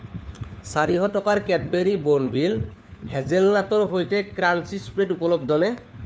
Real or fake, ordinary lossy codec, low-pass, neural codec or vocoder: fake; none; none; codec, 16 kHz, 4 kbps, FunCodec, trained on LibriTTS, 50 frames a second